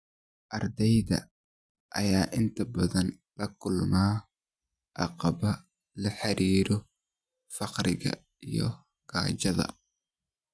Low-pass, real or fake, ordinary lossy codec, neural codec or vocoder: none; real; none; none